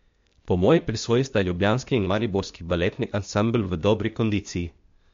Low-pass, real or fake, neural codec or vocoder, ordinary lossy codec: 7.2 kHz; fake; codec, 16 kHz, 0.8 kbps, ZipCodec; MP3, 48 kbps